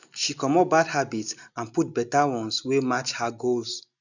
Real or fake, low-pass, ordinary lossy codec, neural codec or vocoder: real; 7.2 kHz; none; none